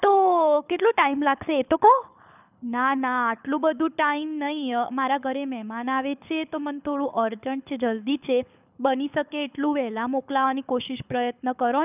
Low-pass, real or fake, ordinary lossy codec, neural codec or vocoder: 3.6 kHz; fake; none; codec, 24 kHz, 6 kbps, HILCodec